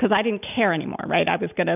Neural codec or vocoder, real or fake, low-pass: none; real; 3.6 kHz